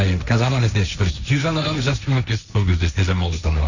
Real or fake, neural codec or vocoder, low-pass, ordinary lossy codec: fake; codec, 16 kHz, 1.1 kbps, Voila-Tokenizer; 7.2 kHz; none